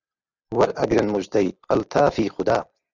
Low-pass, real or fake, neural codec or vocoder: 7.2 kHz; real; none